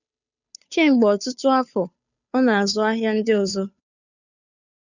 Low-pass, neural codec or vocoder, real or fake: 7.2 kHz; codec, 16 kHz, 2 kbps, FunCodec, trained on Chinese and English, 25 frames a second; fake